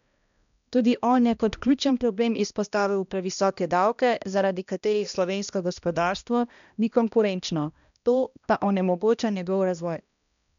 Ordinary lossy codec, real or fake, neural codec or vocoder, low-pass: MP3, 96 kbps; fake; codec, 16 kHz, 1 kbps, X-Codec, HuBERT features, trained on balanced general audio; 7.2 kHz